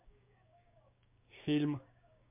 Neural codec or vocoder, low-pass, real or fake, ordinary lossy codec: codec, 16 kHz, 6 kbps, DAC; 3.6 kHz; fake; MP3, 32 kbps